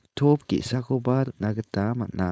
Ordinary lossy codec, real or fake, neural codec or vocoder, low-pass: none; fake; codec, 16 kHz, 4.8 kbps, FACodec; none